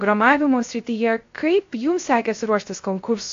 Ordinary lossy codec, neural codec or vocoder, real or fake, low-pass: AAC, 48 kbps; codec, 16 kHz, 0.3 kbps, FocalCodec; fake; 7.2 kHz